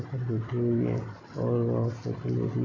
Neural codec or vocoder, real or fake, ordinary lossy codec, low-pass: none; real; AAC, 32 kbps; 7.2 kHz